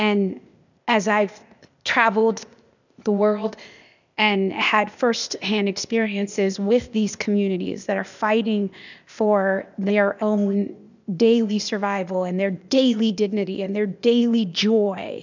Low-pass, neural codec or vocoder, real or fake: 7.2 kHz; codec, 16 kHz, 0.8 kbps, ZipCodec; fake